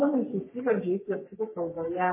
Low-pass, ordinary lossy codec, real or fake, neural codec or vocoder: 3.6 kHz; MP3, 16 kbps; fake; codec, 44.1 kHz, 3.4 kbps, Pupu-Codec